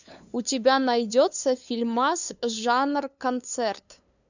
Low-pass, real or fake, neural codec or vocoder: 7.2 kHz; fake; codec, 16 kHz, 2 kbps, FunCodec, trained on LibriTTS, 25 frames a second